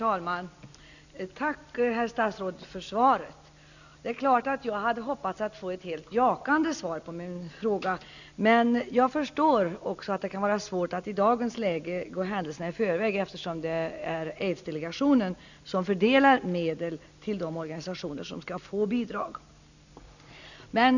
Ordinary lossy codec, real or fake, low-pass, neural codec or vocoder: none; real; 7.2 kHz; none